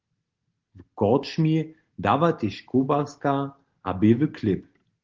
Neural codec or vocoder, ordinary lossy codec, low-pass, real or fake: none; Opus, 16 kbps; 7.2 kHz; real